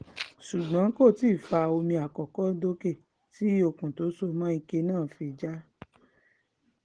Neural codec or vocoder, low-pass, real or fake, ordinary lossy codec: none; 9.9 kHz; real; Opus, 16 kbps